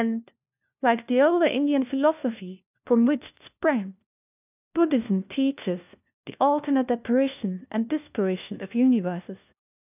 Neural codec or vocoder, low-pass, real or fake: codec, 16 kHz, 1 kbps, FunCodec, trained on LibriTTS, 50 frames a second; 3.6 kHz; fake